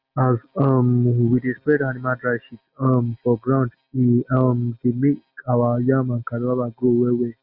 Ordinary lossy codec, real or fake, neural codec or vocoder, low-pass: Opus, 24 kbps; real; none; 5.4 kHz